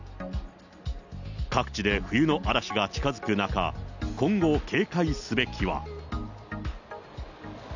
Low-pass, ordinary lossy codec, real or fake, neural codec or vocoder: 7.2 kHz; none; real; none